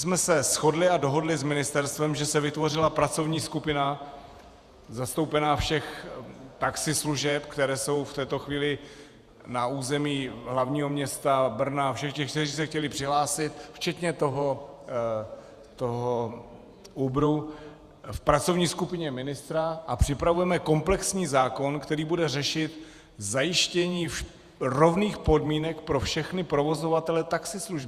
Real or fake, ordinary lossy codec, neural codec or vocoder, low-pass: fake; Opus, 64 kbps; vocoder, 48 kHz, 128 mel bands, Vocos; 14.4 kHz